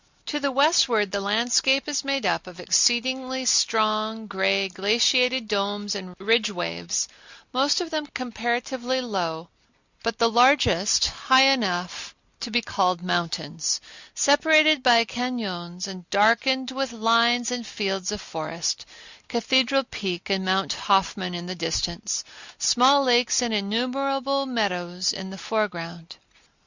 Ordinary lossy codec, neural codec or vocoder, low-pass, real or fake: Opus, 64 kbps; none; 7.2 kHz; real